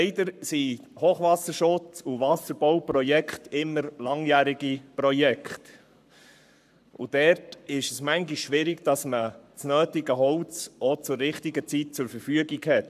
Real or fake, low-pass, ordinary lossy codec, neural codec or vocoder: fake; 14.4 kHz; none; codec, 44.1 kHz, 7.8 kbps, Pupu-Codec